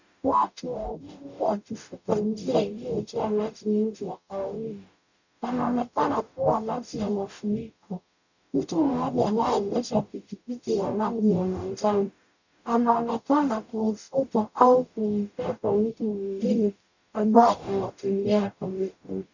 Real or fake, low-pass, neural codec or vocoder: fake; 7.2 kHz; codec, 44.1 kHz, 0.9 kbps, DAC